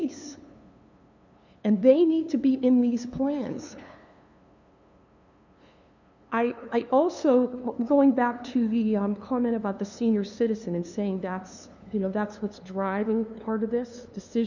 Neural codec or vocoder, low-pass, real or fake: codec, 16 kHz, 2 kbps, FunCodec, trained on LibriTTS, 25 frames a second; 7.2 kHz; fake